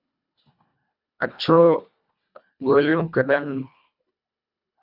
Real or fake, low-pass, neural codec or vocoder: fake; 5.4 kHz; codec, 24 kHz, 1.5 kbps, HILCodec